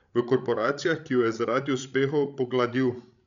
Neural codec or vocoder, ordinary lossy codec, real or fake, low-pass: codec, 16 kHz, 16 kbps, FreqCodec, larger model; none; fake; 7.2 kHz